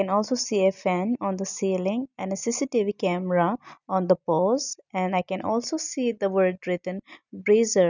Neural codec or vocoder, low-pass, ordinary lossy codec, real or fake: none; 7.2 kHz; none; real